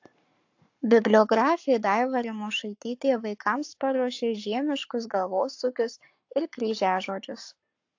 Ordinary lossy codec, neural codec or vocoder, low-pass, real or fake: AAC, 48 kbps; codec, 44.1 kHz, 7.8 kbps, Pupu-Codec; 7.2 kHz; fake